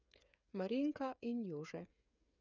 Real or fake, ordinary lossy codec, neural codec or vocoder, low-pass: fake; none; codec, 16 kHz, 8 kbps, FreqCodec, smaller model; 7.2 kHz